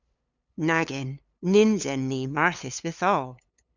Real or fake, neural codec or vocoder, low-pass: fake; codec, 16 kHz, 8 kbps, FunCodec, trained on LibriTTS, 25 frames a second; 7.2 kHz